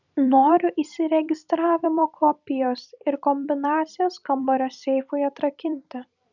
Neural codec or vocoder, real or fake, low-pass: vocoder, 44.1 kHz, 128 mel bands every 256 samples, BigVGAN v2; fake; 7.2 kHz